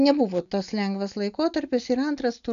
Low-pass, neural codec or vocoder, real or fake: 7.2 kHz; none; real